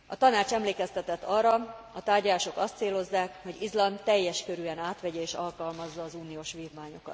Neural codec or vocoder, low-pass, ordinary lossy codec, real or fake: none; none; none; real